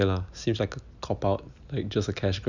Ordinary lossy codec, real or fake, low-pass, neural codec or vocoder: none; real; 7.2 kHz; none